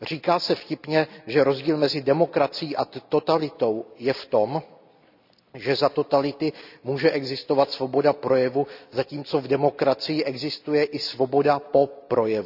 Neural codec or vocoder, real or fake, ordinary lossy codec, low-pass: none; real; none; 5.4 kHz